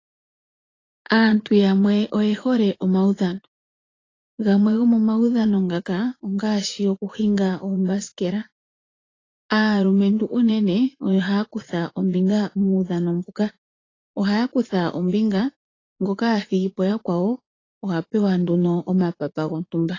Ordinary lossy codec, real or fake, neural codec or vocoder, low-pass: AAC, 32 kbps; fake; vocoder, 44.1 kHz, 80 mel bands, Vocos; 7.2 kHz